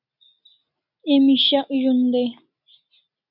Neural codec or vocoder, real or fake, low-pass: none; real; 5.4 kHz